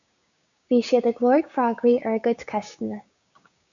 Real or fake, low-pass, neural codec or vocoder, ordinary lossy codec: fake; 7.2 kHz; codec, 16 kHz, 6 kbps, DAC; MP3, 96 kbps